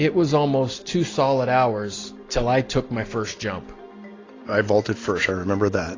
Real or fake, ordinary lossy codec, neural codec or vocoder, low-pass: real; AAC, 32 kbps; none; 7.2 kHz